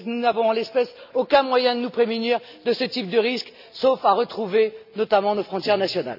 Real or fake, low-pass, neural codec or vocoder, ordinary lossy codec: real; 5.4 kHz; none; none